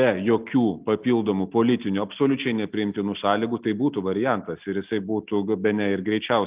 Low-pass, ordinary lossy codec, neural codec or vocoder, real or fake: 3.6 kHz; Opus, 24 kbps; none; real